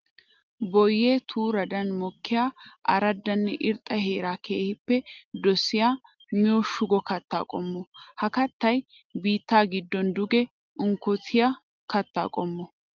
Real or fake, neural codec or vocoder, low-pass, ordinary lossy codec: real; none; 7.2 kHz; Opus, 32 kbps